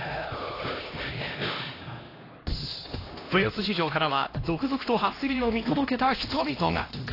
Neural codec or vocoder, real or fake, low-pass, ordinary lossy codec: codec, 16 kHz, 1 kbps, X-Codec, HuBERT features, trained on LibriSpeech; fake; 5.4 kHz; AAC, 24 kbps